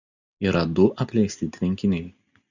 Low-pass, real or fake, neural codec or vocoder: 7.2 kHz; real; none